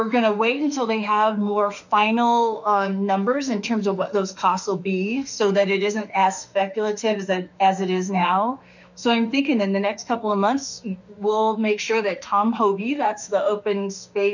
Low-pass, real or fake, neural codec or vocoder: 7.2 kHz; fake; autoencoder, 48 kHz, 32 numbers a frame, DAC-VAE, trained on Japanese speech